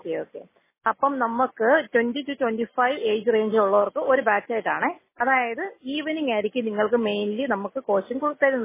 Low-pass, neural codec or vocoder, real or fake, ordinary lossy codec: 3.6 kHz; none; real; MP3, 16 kbps